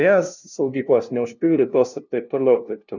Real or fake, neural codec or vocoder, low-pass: fake; codec, 16 kHz, 0.5 kbps, FunCodec, trained on LibriTTS, 25 frames a second; 7.2 kHz